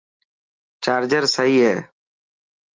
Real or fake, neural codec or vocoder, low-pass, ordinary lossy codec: real; none; 7.2 kHz; Opus, 24 kbps